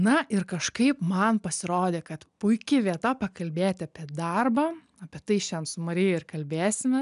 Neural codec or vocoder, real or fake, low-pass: none; real; 10.8 kHz